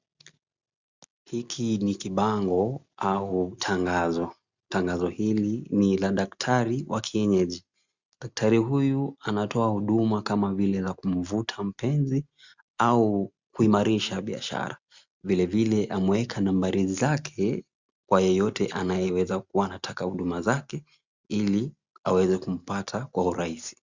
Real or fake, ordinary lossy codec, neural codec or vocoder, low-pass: real; Opus, 64 kbps; none; 7.2 kHz